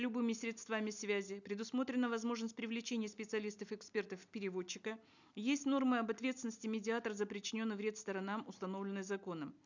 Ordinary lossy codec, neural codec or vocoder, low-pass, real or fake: none; none; 7.2 kHz; real